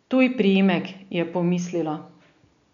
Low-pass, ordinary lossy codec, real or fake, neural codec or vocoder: 7.2 kHz; none; real; none